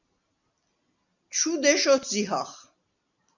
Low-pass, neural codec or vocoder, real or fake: 7.2 kHz; none; real